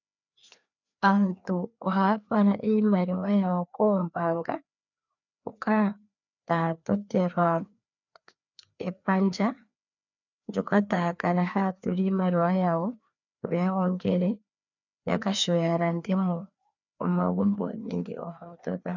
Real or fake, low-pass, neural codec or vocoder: fake; 7.2 kHz; codec, 16 kHz, 2 kbps, FreqCodec, larger model